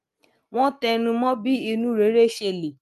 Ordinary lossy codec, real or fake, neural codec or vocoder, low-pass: Opus, 32 kbps; real; none; 14.4 kHz